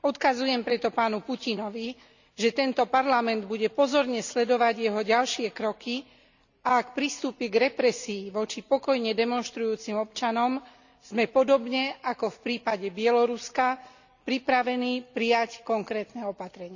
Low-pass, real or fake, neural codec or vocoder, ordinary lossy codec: 7.2 kHz; real; none; none